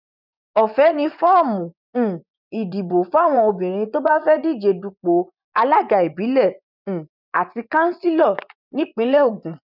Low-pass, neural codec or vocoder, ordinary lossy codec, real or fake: 5.4 kHz; vocoder, 24 kHz, 100 mel bands, Vocos; none; fake